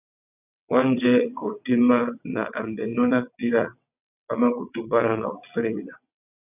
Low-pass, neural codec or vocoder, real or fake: 3.6 kHz; vocoder, 22.05 kHz, 80 mel bands, WaveNeXt; fake